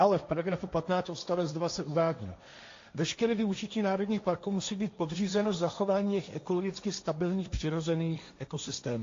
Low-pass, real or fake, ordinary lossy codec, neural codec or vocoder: 7.2 kHz; fake; AAC, 48 kbps; codec, 16 kHz, 1.1 kbps, Voila-Tokenizer